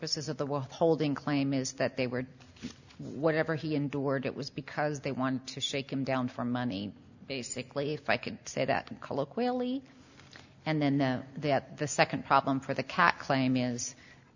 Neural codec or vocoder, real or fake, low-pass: none; real; 7.2 kHz